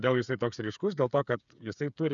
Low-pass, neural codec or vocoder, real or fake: 7.2 kHz; codec, 16 kHz, 8 kbps, FreqCodec, smaller model; fake